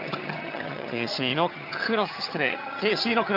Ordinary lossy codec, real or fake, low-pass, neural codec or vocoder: none; fake; 5.4 kHz; vocoder, 22.05 kHz, 80 mel bands, HiFi-GAN